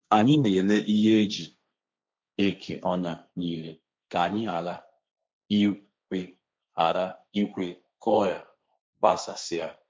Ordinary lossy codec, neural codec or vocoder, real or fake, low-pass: none; codec, 16 kHz, 1.1 kbps, Voila-Tokenizer; fake; none